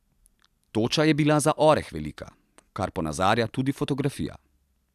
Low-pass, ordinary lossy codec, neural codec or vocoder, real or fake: 14.4 kHz; none; none; real